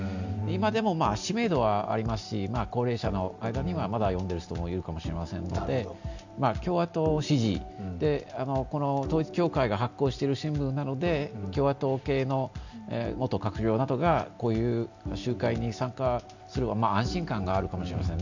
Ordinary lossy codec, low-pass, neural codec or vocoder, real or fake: none; 7.2 kHz; none; real